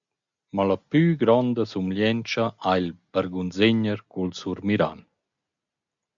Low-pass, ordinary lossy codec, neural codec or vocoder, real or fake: 7.2 kHz; MP3, 96 kbps; none; real